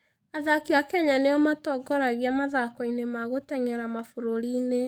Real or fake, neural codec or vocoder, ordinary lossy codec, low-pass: fake; codec, 44.1 kHz, 7.8 kbps, DAC; none; none